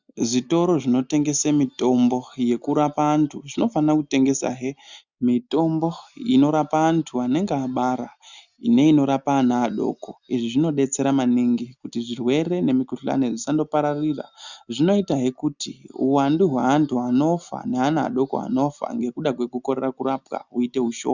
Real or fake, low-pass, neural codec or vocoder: real; 7.2 kHz; none